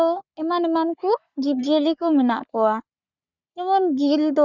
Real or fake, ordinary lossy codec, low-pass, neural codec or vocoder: fake; Opus, 64 kbps; 7.2 kHz; codec, 44.1 kHz, 3.4 kbps, Pupu-Codec